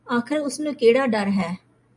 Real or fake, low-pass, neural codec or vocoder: real; 10.8 kHz; none